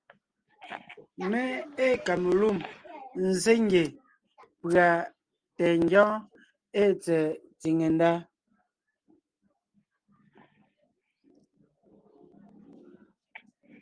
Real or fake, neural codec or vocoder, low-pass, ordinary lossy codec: real; none; 9.9 kHz; Opus, 32 kbps